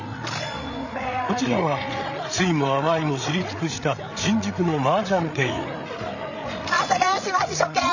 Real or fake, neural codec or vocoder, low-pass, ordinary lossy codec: fake; codec, 16 kHz, 8 kbps, FreqCodec, larger model; 7.2 kHz; none